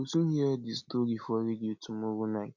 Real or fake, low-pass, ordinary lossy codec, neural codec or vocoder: real; 7.2 kHz; none; none